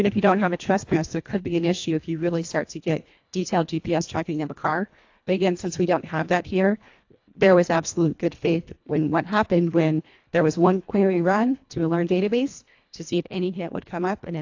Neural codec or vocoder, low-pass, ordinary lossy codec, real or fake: codec, 24 kHz, 1.5 kbps, HILCodec; 7.2 kHz; AAC, 48 kbps; fake